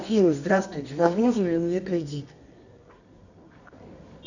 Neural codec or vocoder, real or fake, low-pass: codec, 24 kHz, 0.9 kbps, WavTokenizer, medium music audio release; fake; 7.2 kHz